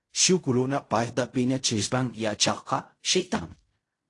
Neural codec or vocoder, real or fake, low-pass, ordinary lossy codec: codec, 16 kHz in and 24 kHz out, 0.4 kbps, LongCat-Audio-Codec, fine tuned four codebook decoder; fake; 10.8 kHz; AAC, 48 kbps